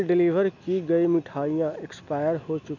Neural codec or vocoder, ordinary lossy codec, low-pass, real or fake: none; none; 7.2 kHz; real